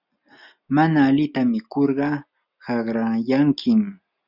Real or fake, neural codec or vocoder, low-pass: real; none; 5.4 kHz